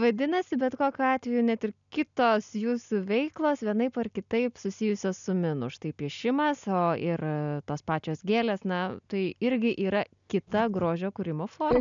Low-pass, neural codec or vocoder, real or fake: 7.2 kHz; none; real